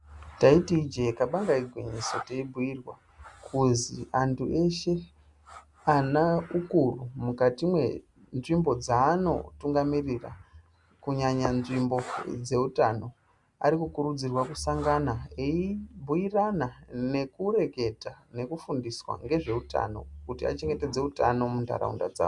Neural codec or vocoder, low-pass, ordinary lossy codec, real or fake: none; 10.8 kHz; Opus, 64 kbps; real